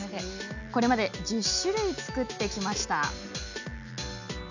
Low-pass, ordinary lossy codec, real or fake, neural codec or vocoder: 7.2 kHz; none; real; none